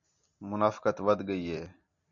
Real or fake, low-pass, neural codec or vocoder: real; 7.2 kHz; none